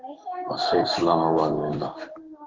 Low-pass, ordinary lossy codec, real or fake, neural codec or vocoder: 7.2 kHz; Opus, 16 kbps; fake; codec, 16 kHz in and 24 kHz out, 1 kbps, XY-Tokenizer